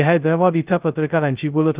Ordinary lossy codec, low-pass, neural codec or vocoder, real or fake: Opus, 32 kbps; 3.6 kHz; codec, 16 kHz, 0.2 kbps, FocalCodec; fake